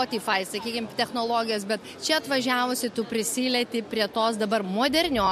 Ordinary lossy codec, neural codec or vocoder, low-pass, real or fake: MP3, 64 kbps; none; 14.4 kHz; real